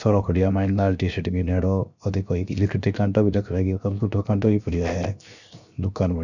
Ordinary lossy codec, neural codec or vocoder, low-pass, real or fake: none; codec, 16 kHz, 0.7 kbps, FocalCodec; 7.2 kHz; fake